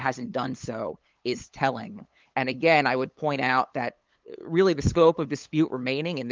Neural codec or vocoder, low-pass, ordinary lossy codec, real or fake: codec, 16 kHz, 8 kbps, FunCodec, trained on LibriTTS, 25 frames a second; 7.2 kHz; Opus, 24 kbps; fake